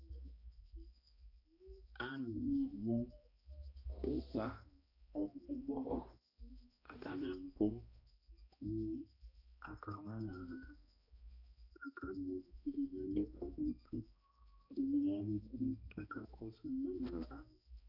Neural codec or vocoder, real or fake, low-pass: codec, 16 kHz, 1 kbps, X-Codec, HuBERT features, trained on balanced general audio; fake; 5.4 kHz